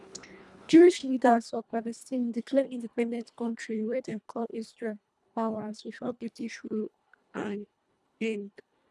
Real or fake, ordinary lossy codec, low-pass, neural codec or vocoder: fake; none; none; codec, 24 kHz, 1.5 kbps, HILCodec